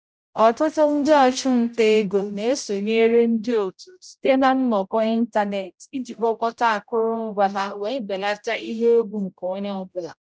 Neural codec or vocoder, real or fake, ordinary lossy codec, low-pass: codec, 16 kHz, 0.5 kbps, X-Codec, HuBERT features, trained on general audio; fake; none; none